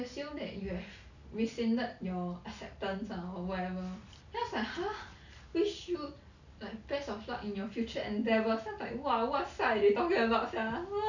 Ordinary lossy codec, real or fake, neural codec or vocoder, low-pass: none; real; none; 7.2 kHz